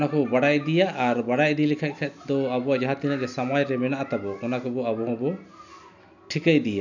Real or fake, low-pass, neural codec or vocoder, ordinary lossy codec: real; 7.2 kHz; none; none